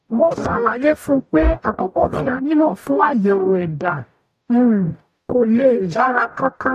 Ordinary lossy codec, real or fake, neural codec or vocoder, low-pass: none; fake; codec, 44.1 kHz, 0.9 kbps, DAC; 14.4 kHz